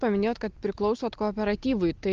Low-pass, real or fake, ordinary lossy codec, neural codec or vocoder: 7.2 kHz; real; Opus, 24 kbps; none